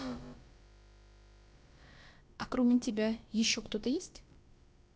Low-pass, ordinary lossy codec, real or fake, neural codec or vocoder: none; none; fake; codec, 16 kHz, about 1 kbps, DyCAST, with the encoder's durations